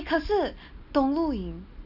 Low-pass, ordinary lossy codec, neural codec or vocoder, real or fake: 5.4 kHz; none; none; real